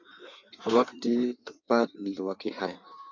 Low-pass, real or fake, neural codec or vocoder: 7.2 kHz; fake; codec, 16 kHz in and 24 kHz out, 1.1 kbps, FireRedTTS-2 codec